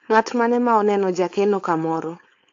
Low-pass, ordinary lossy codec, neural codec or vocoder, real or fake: 7.2 kHz; AAC, 48 kbps; codec, 16 kHz, 4.8 kbps, FACodec; fake